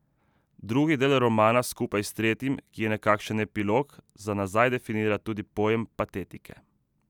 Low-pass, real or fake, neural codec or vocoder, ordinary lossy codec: 19.8 kHz; real; none; none